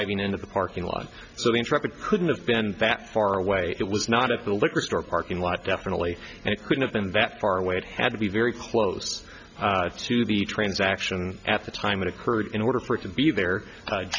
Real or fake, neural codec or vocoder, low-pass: real; none; 7.2 kHz